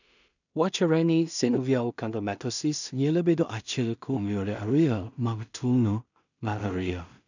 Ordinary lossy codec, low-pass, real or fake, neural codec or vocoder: none; 7.2 kHz; fake; codec, 16 kHz in and 24 kHz out, 0.4 kbps, LongCat-Audio-Codec, two codebook decoder